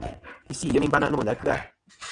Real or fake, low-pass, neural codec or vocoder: fake; 9.9 kHz; vocoder, 22.05 kHz, 80 mel bands, WaveNeXt